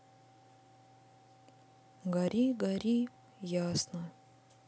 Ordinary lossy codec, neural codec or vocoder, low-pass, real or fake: none; none; none; real